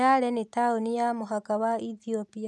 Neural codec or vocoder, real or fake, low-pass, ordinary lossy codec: none; real; none; none